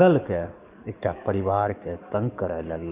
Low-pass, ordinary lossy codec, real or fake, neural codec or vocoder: 3.6 kHz; none; fake; codec, 44.1 kHz, 7.8 kbps, DAC